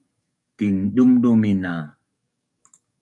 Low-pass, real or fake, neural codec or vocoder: 10.8 kHz; fake; codec, 44.1 kHz, 7.8 kbps, DAC